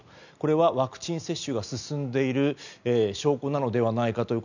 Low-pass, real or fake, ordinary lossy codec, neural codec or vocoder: 7.2 kHz; real; none; none